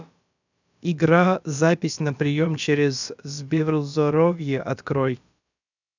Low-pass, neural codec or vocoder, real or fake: 7.2 kHz; codec, 16 kHz, about 1 kbps, DyCAST, with the encoder's durations; fake